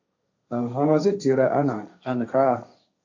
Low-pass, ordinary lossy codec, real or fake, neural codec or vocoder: 7.2 kHz; AAC, 48 kbps; fake; codec, 16 kHz, 1.1 kbps, Voila-Tokenizer